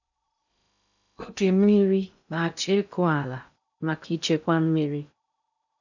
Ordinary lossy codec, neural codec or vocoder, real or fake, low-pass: none; codec, 16 kHz in and 24 kHz out, 0.6 kbps, FocalCodec, streaming, 2048 codes; fake; 7.2 kHz